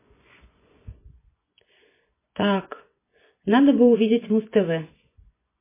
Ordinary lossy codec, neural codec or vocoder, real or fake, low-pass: MP3, 16 kbps; vocoder, 24 kHz, 100 mel bands, Vocos; fake; 3.6 kHz